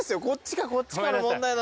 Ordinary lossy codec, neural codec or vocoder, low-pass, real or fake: none; none; none; real